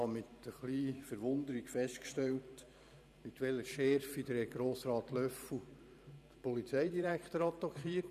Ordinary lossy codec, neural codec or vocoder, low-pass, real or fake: none; vocoder, 44.1 kHz, 128 mel bands every 512 samples, BigVGAN v2; 14.4 kHz; fake